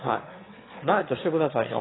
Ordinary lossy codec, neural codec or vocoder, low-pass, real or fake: AAC, 16 kbps; autoencoder, 22.05 kHz, a latent of 192 numbers a frame, VITS, trained on one speaker; 7.2 kHz; fake